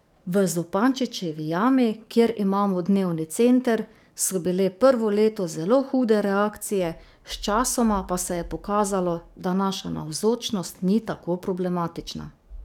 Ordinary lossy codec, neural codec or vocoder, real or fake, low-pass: none; codec, 44.1 kHz, 7.8 kbps, DAC; fake; 19.8 kHz